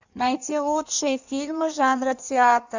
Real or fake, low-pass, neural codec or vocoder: fake; 7.2 kHz; codec, 16 kHz in and 24 kHz out, 1.1 kbps, FireRedTTS-2 codec